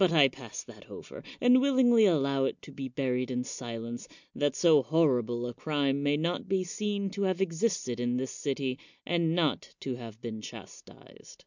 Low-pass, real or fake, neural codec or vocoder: 7.2 kHz; real; none